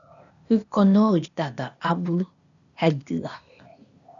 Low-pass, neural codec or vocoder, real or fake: 7.2 kHz; codec, 16 kHz, 0.8 kbps, ZipCodec; fake